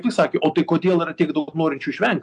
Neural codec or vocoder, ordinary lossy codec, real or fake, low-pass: none; AAC, 64 kbps; real; 10.8 kHz